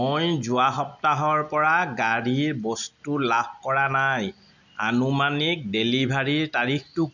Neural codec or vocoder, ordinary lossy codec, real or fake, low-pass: none; none; real; 7.2 kHz